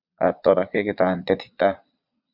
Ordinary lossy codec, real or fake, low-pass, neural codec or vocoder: Opus, 64 kbps; fake; 5.4 kHz; vocoder, 22.05 kHz, 80 mel bands, Vocos